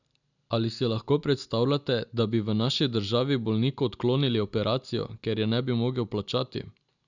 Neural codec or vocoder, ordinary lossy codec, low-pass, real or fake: none; none; 7.2 kHz; real